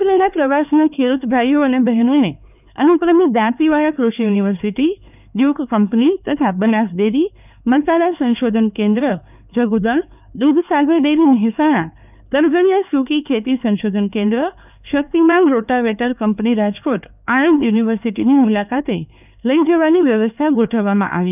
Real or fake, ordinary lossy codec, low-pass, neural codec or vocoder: fake; none; 3.6 kHz; codec, 16 kHz, 4 kbps, X-Codec, HuBERT features, trained on LibriSpeech